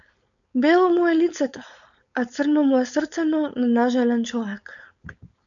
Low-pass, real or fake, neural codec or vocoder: 7.2 kHz; fake; codec, 16 kHz, 4.8 kbps, FACodec